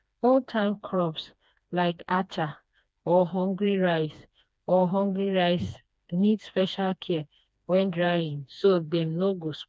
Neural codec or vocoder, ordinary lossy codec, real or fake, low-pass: codec, 16 kHz, 2 kbps, FreqCodec, smaller model; none; fake; none